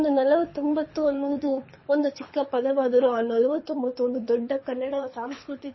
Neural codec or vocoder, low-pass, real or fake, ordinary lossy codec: codec, 24 kHz, 6 kbps, HILCodec; 7.2 kHz; fake; MP3, 24 kbps